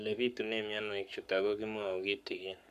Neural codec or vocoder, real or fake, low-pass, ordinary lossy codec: codec, 44.1 kHz, 7.8 kbps, Pupu-Codec; fake; 14.4 kHz; none